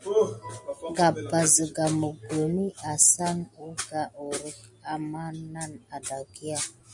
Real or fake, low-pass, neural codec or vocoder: real; 10.8 kHz; none